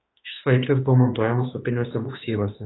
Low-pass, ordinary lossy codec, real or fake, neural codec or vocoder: 7.2 kHz; AAC, 16 kbps; fake; autoencoder, 48 kHz, 32 numbers a frame, DAC-VAE, trained on Japanese speech